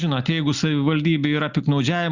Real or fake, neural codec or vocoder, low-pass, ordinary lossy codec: real; none; 7.2 kHz; Opus, 64 kbps